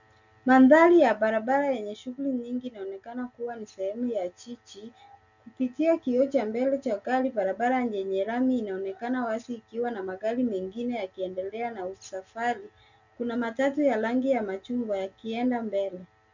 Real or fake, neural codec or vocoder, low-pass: real; none; 7.2 kHz